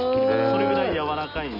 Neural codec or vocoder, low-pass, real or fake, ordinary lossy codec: none; 5.4 kHz; real; none